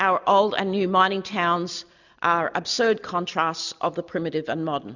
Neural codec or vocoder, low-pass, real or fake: none; 7.2 kHz; real